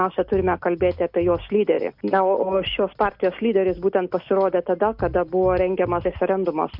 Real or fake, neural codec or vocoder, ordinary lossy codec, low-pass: real; none; MP3, 48 kbps; 5.4 kHz